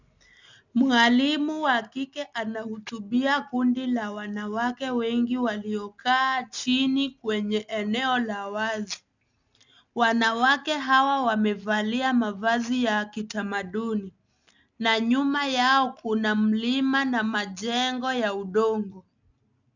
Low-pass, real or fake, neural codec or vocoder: 7.2 kHz; real; none